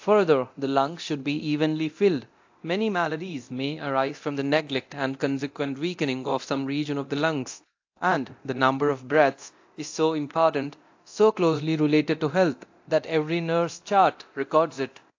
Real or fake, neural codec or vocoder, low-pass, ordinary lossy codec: fake; codec, 24 kHz, 0.9 kbps, DualCodec; 7.2 kHz; AAC, 48 kbps